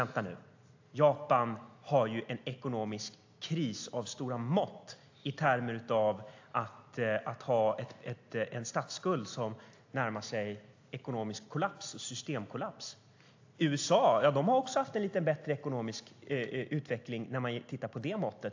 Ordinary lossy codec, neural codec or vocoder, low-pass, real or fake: AAC, 48 kbps; none; 7.2 kHz; real